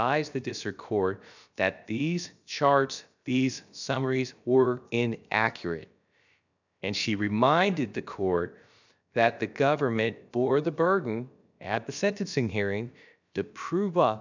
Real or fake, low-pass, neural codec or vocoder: fake; 7.2 kHz; codec, 16 kHz, about 1 kbps, DyCAST, with the encoder's durations